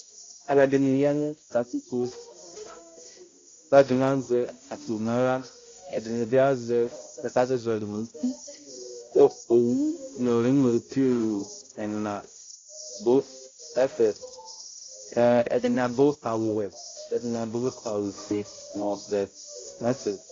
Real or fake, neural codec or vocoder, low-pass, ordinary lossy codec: fake; codec, 16 kHz, 0.5 kbps, X-Codec, HuBERT features, trained on balanced general audio; 7.2 kHz; AAC, 32 kbps